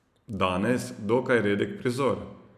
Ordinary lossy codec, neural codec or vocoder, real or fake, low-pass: none; none; real; 14.4 kHz